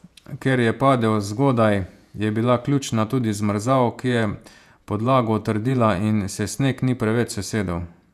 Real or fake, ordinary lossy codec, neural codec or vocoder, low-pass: fake; none; vocoder, 48 kHz, 128 mel bands, Vocos; 14.4 kHz